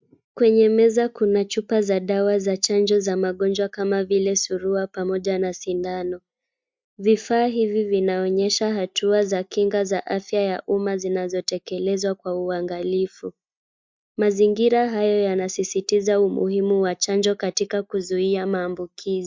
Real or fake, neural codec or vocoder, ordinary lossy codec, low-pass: real; none; MP3, 64 kbps; 7.2 kHz